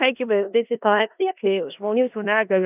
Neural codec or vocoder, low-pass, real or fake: codec, 16 kHz in and 24 kHz out, 0.4 kbps, LongCat-Audio-Codec, four codebook decoder; 3.6 kHz; fake